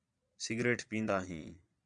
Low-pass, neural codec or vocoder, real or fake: 9.9 kHz; vocoder, 22.05 kHz, 80 mel bands, Vocos; fake